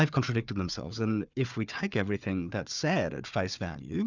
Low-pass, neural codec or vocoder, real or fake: 7.2 kHz; codec, 16 kHz, 4 kbps, FunCodec, trained on Chinese and English, 50 frames a second; fake